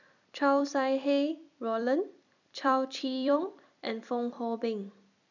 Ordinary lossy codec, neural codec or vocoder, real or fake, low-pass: none; vocoder, 44.1 kHz, 128 mel bands every 256 samples, BigVGAN v2; fake; 7.2 kHz